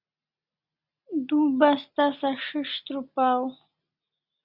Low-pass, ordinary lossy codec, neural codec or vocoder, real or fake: 5.4 kHz; Opus, 64 kbps; none; real